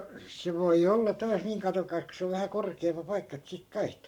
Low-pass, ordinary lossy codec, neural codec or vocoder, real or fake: 19.8 kHz; none; codec, 44.1 kHz, 7.8 kbps, Pupu-Codec; fake